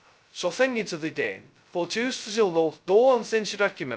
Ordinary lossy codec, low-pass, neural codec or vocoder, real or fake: none; none; codec, 16 kHz, 0.2 kbps, FocalCodec; fake